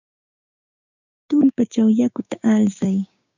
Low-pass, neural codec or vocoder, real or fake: 7.2 kHz; codec, 16 kHz, 6 kbps, DAC; fake